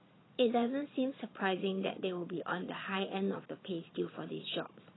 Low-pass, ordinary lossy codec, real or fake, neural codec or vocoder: 7.2 kHz; AAC, 16 kbps; fake; vocoder, 22.05 kHz, 80 mel bands, Vocos